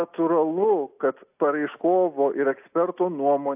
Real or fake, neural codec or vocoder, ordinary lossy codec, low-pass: real; none; AAC, 32 kbps; 3.6 kHz